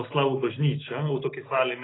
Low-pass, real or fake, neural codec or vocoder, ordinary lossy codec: 7.2 kHz; real; none; AAC, 16 kbps